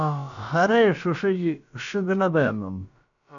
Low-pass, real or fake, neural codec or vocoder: 7.2 kHz; fake; codec, 16 kHz, about 1 kbps, DyCAST, with the encoder's durations